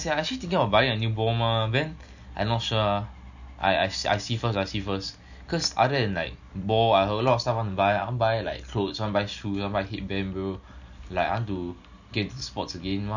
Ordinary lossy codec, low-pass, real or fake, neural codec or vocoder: none; 7.2 kHz; real; none